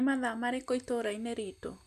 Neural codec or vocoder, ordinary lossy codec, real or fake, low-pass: none; none; real; 14.4 kHz